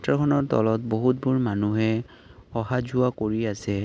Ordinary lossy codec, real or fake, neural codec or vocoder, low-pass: none; real; none; none